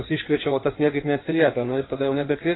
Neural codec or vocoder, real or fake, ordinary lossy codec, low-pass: codec, 16 kHz in and 24 kHz out, 1.1 kbps, FireRedTTS-2 codec; fake; AAC, 16 kbps; 7.2 kHz